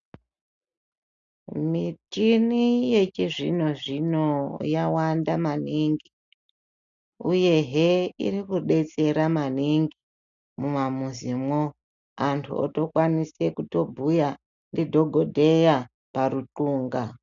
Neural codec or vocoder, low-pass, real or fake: none; 7.2 kHz; real